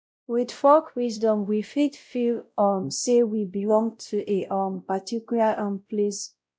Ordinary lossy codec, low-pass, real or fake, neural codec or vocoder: none; none; fake; codec, 16 kHz, 0.5 kbps, X-Codec, WavLM features, trained on Multilingual LibriSpeech